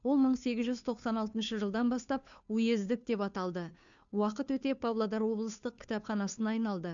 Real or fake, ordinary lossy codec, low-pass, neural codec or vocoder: fake; none; 7.2 kHz; codec, 16 kHz, 2 kbps, FunCodec, trained on Chinese and English, 25 frames a second